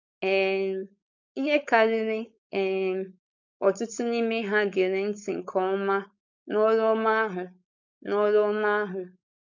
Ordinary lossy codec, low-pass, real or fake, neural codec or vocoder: none; 7.2 kHz; fake; codec, 16 kHz, 4.8 kbps, FACodec